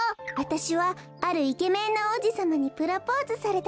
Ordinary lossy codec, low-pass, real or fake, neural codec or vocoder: none; none; real; none